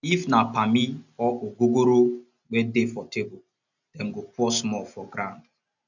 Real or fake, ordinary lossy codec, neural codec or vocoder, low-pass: real; none; none; 7.2 kHz